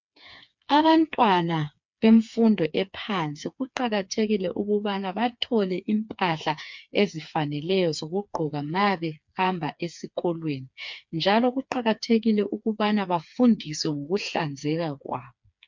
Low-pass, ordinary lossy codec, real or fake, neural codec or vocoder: 7.2 kHz; MP3, 64 kbps; fake; codec, 16 kHz, 4 kbps, FreqCodec, smaller model